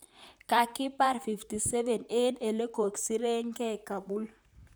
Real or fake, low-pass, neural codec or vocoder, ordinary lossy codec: fake; none; vocoder, 44.1 kHz, 128 mel bands, Pupu-Vocoder; none